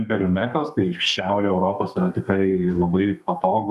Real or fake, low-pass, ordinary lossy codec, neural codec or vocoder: fake; 14.4 kHz; MP3, 96 kbps; codec, 32 kHz, 1.9 kbps, SNAC